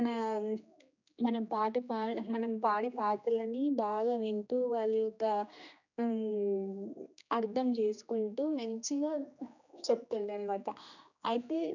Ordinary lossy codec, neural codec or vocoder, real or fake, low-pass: none; codec, 16 kHz, 2 kbps, X-Codec, HuBERT features, trained on general audio; fake; 7.2 kHz